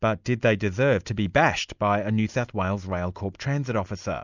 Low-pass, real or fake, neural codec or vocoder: 7.2 kHz; real; none